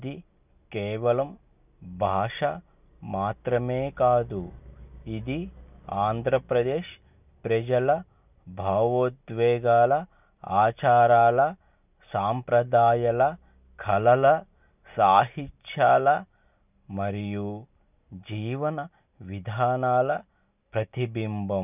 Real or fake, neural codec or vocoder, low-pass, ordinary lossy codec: real; none; 3.6 kHz; none